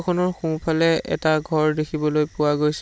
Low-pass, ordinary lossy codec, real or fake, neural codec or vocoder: none; none; real; none